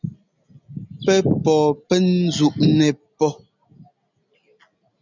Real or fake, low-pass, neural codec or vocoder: real; 7.2 kHz; none